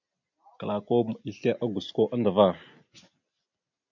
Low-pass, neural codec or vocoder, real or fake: 7.2 kHz; none; real